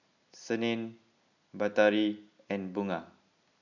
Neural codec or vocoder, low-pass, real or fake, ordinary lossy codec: none; 7.2 kHz; real; none